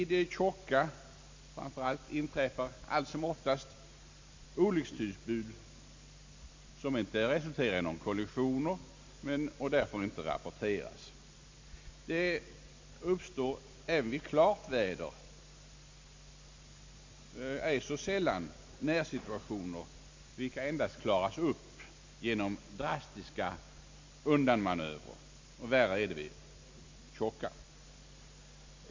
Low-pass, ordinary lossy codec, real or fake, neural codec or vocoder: 7.2 kHz; MP3, 48 kbps; real; none